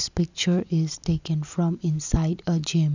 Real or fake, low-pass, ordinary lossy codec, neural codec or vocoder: real; 7.2 kHz; none; none